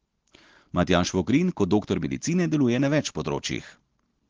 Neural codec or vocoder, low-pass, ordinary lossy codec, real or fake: none; 7.2 kHz; Opus, 16 kbps; real